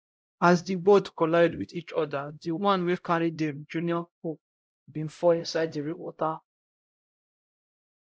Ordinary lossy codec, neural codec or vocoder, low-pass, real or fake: none; codec, 16 kHz, 0.5 kbps, X-Codec, HuBERT features, trained on LibriSpeech; none; fake